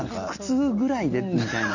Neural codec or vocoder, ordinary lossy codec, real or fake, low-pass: none; none; real; 7.2 kHz